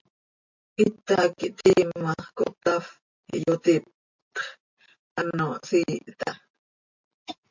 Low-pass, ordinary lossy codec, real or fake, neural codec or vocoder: 7.2 kHz; MP3, 48 kbps; real; none